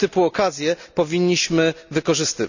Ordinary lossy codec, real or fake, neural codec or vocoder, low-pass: none; real; none; 7.2 kHz